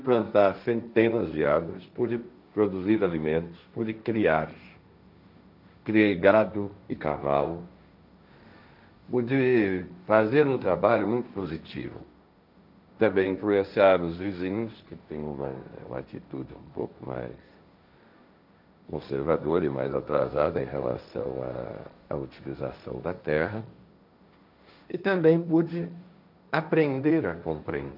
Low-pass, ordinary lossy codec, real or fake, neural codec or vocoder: 5.4 kHz; none; fake; codec, 16 kHz, 1.1 kbps, Voila-Tokenizer